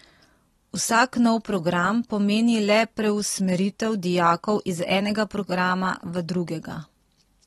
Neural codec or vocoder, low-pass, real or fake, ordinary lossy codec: none; 19.8 kHz; real; AAC, 32 kbps